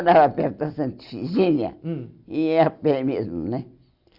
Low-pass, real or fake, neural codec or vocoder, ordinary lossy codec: 5.4 kHz; real; none; Opus, 64 kbps